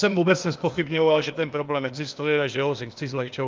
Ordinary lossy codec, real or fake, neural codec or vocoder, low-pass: Opus, 24 kbps; fake; codec, 16 kHz, 0.8 kbps, ZipCodec; 7.2 kHz